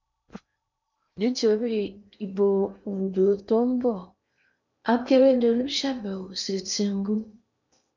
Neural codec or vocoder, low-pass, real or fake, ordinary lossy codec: codec, 16 kHz in and 24 kHz out, 0.8 kbps, FocalCodec, streaming, 65536 codes; 7.2 kHz; fake; none